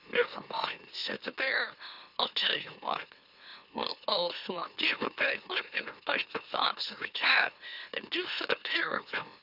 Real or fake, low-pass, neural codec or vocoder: fake; 5.4 kHz; autoencoder, 44.1 kHz, a latent of 192 numbers a frame, MeloTTS